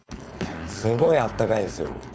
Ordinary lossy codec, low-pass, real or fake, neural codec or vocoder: none; none; fake; codec, 16 kHz, 4.8 kbps, FACodec